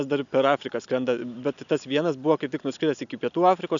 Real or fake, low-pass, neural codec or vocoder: real; 7.2 kHz; none